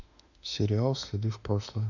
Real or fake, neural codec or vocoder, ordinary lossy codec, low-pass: fake; autoencoder, 48 kHz, 32 numbers a frame, DAC-VAE, trained on Japanese speech; none; 7.2 kHz